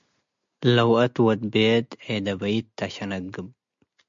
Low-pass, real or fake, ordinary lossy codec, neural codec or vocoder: 7.2 kHz; real; MP3, 64 kbps; none